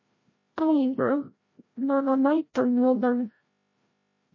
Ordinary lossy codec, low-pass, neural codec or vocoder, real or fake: MP3, 32 kbps; 7.2 kHz; codec, 16 kHz, 0.5 kbps, FreqCodec, larger model; fake